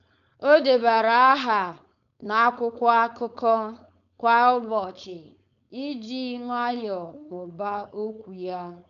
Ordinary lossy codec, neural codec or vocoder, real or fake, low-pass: none; codec, 16 kHz, 4.8 kbps, FACodec; fake; 7.2 kHz